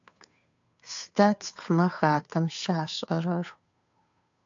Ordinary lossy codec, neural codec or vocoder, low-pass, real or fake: AAC, 64 kbps; codec, 16 kHz, 2 kbps, FunCodec, trained on Chinese and English, 25 frames a second; 7.2 kHz; fake